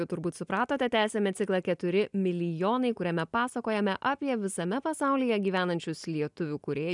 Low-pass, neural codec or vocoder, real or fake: 10.8 kHz; none; real